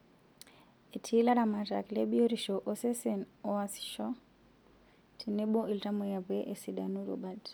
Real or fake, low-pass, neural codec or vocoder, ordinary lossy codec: real; none; none; none